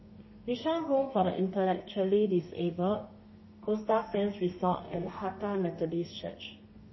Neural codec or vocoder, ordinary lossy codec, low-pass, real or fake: codec, 32 kHz, 1.9 kbps, SNAC; MP3, 24 kbps; 7.2 kHz; fake